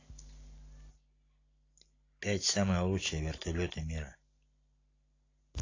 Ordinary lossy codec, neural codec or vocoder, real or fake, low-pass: AAC, 32 kbps; none; real; 7.2 kHz